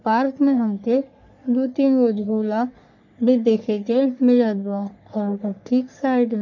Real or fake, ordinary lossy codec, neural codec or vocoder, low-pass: fake; none; codec, 44.1 kHz, 3.4 kbps, Pupu-Codec; 7.2 kHz